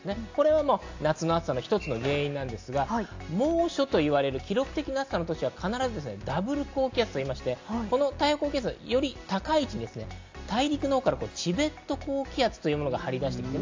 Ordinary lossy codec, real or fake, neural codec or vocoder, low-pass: AAC, 48 kbps; real; none; 7.2 kHz